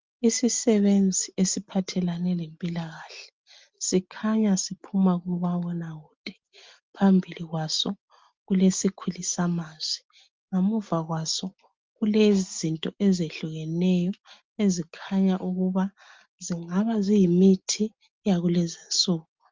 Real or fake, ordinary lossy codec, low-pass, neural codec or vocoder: real; Opus, 32 kbps; 7.2 kHz; none